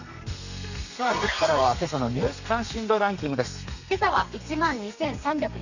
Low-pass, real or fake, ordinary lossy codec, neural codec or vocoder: 7.2 kHz; fake; none; codec, 32 kHz, 1.9 kbps, SNAC